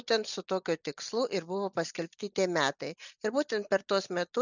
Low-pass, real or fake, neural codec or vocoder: 7.2 kHz; real; none